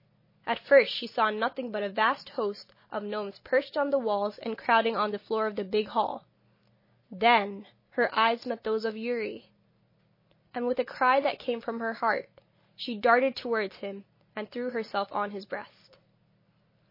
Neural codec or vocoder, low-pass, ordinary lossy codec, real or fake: none; 5.4 kHz; MP3, 24 kbps; real